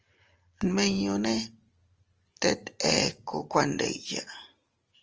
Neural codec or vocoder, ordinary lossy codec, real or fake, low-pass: none; Opus, 24 kbps; real; 7.2 kHz